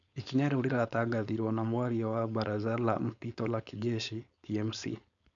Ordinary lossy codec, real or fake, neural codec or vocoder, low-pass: none; fake; codec, 16 kHz, 4.8 kbps, FACodec; 7.2 kHz